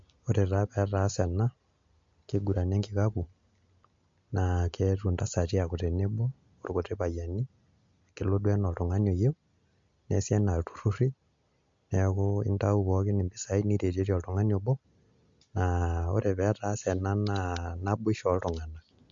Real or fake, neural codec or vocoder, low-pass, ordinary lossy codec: real; none; 7.2 kHz; MP3, 48 kbps